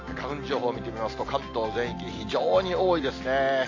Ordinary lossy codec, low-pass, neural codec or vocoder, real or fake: AAC, 32 kbps; 7.2 kHz; none; real